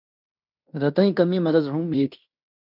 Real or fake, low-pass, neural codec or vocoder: fake; 5.4 kHz; codec, 16 kHz in and 24 kHz out, 0.9 kbps, LongCat-Audio-Codec, fine tuned four codebook decoder